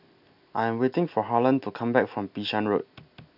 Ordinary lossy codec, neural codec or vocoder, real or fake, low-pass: none; none; real; 5.4 kHz